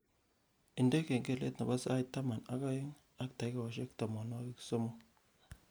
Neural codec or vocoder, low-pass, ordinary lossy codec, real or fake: none; none; none; real